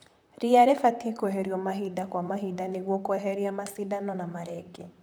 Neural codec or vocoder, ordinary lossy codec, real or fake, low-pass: vocoder, 44.1 kHz, 128 mel bands, Pupu-Vocoder; none; fake; none